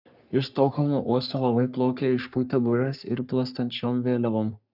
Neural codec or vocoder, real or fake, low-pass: codec, 44.1 kHz, 3.4 kbps, Pupu-Codec; fake; 5.4 kHz